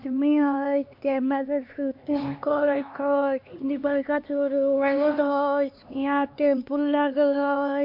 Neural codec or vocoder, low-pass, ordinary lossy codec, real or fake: codec, 16 kHz, 2 kbps, X-Codec, HuBERT features, trained on LibriSpeech; 5.4 kHz; MP3, 48 kbps; fake